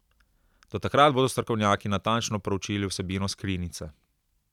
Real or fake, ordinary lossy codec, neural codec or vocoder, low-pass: real; none; none; 19.8 kHz